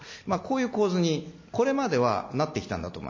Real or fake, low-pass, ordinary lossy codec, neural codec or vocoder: real; 7.2 kHz; MP3, 32 kbps; none